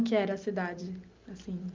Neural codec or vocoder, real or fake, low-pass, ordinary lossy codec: none; real; 7.2 kHz; Opus, 24 kbps